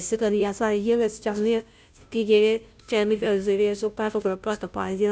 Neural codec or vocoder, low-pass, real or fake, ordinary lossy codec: codec, 16 kHz, 0.5 kbps, FunCodec, trained on Chinese and English, 25 frames a second; none; fake; none